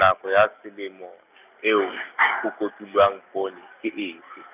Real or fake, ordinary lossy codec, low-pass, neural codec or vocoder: real; none; 3.6 kHz; none